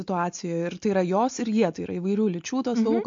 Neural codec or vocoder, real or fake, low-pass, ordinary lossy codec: none; real; 7.2 kHz; MP3, 48 kbps